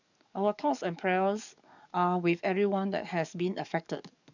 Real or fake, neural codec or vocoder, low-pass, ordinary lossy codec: fake; codec, 44.1 kHz, 7.8 kbps, DAC; 7.2 kHz; none